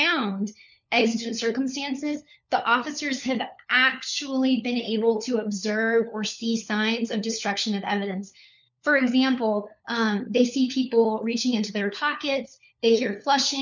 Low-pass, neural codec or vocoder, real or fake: 7.2 kHz; codec, 16 kHz, 4 kbps, FunCodec, trained on LibriTTS, 50 frames a second; fake